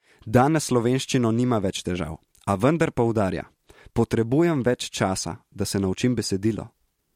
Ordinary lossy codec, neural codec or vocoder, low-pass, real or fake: MP3, 64 kbps; none; 19.8 kHz; real